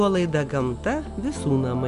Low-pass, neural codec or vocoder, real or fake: 10.8 kHz; none; real